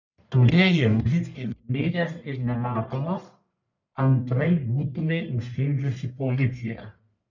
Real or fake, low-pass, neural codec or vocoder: fake; 7.2 kHz; codec, 44.1 kHz, 1.7 kbps, Pupu-Codec